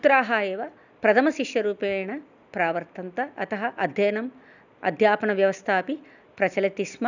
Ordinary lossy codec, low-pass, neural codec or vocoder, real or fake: none; 7.2 kHz; none; real